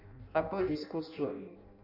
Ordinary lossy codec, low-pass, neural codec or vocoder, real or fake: none; 5.4 kHz; codec, 16 kHz in and 24 kHz out, 0.6 kbps, FireRedTTS-2 codec; fake